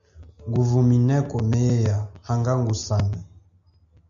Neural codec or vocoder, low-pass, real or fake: none; 7.2 kHz; real